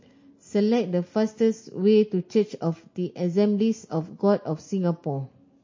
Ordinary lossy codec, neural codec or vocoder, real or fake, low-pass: MP3, 32 kbps; none; real; 7.2 kHz